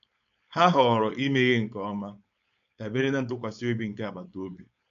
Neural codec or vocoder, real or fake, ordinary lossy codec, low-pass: codec, 16 kHz, 4.8 kbps, FACodec; fake; none; 7.2 kHz